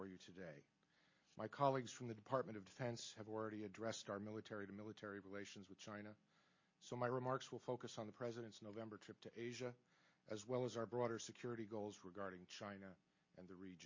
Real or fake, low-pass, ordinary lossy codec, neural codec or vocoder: real; 7.2 kHz; MP3, 32 kbps; none